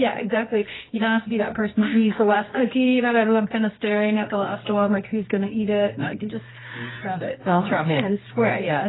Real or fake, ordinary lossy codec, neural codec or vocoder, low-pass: fake; AAC, 16 kbps; codec, 24 kHz, 0.9 kbps, WavTokenizer, medium music audio release; 7.2 kHz